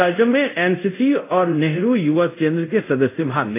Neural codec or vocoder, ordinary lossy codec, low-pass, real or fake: codec, 24 kHz, 0.5 kbps, DualCodec; AAC, 24 kbps; 3.6 kHz; fake